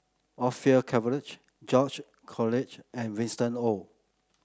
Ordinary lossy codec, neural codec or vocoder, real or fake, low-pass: none; none; real; none